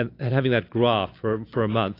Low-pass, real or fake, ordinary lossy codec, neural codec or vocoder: 5.4 kHz; real; AAC, 32 kbps; none